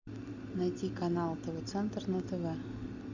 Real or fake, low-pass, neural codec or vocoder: real; 7.2 kHz; none